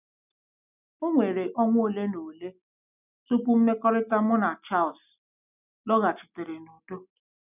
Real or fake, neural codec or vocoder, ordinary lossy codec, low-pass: real; none; none; 3.6 kHz